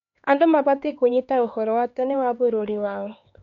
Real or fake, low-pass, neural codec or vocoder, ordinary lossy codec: fake; 7.2 kHz; codec, 16 kHz, 4 kbps, X-Codec, HuBERT features, trained on LibriSpeech; MP3, 48 kbps